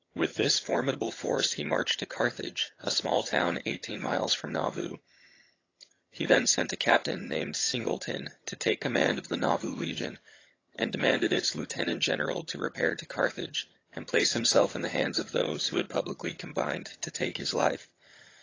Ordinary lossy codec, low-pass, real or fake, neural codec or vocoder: AAC, 32 kbps; 7.2 kHz; fake; vocoder, 22.05 kHz, 80 mel bands, HiFi-GAN